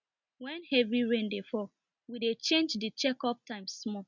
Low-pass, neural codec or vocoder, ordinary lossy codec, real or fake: 7.2 kHz; none; none; real